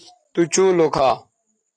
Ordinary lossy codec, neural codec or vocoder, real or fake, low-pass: AAC, 48 kbps; none; real; 9.9 kHz